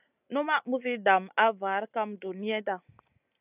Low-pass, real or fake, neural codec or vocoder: 3.6 kHz; real; none